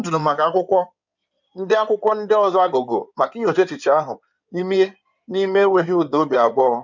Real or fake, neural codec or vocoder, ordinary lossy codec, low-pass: fake; codec, 16 kHz in and 24 kHz out, 2.2 kbps, FireRedTTS-2 codec; none; 7.2 kHz